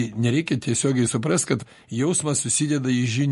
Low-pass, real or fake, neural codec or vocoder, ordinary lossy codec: 14.4 kHz; real; none; MP3, 48 kbps